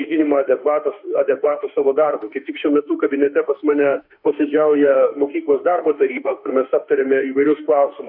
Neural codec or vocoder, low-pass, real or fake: autoencoder, 48 kHz, 32 numbers a frame, DAC-VAE, trained on Japanese speech; 5.4 kHz; fake